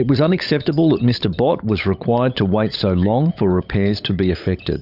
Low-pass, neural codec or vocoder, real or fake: 5.4 kHz; codec, 16 kHz, 16 kbps, FunCodec, trained on LibriTTS, 50 frames a second; fake